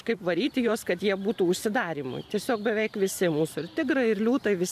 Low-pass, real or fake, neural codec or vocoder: 14.4 kHz; fake; vocoder, 44.1 kHz, 128 mel bands every 512 samples, BigVGAN v2